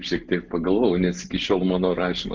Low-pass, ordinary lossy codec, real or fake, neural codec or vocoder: 7.2 kHz; Opus, 24 kbps; real; none